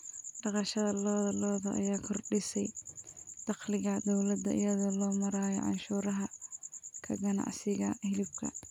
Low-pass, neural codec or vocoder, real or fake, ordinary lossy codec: 19.8 kHz; none; real; none